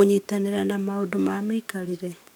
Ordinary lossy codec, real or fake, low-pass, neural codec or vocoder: none; fake; none; codec, 44.1 kHz, 7.8 kbps, DAC